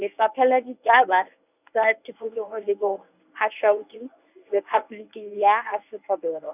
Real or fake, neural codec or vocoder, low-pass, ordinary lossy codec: fake; codec, 24 kHz, 0.9 kbps, WavTokenizer, medium speech release version 1; 3.6 kHz; none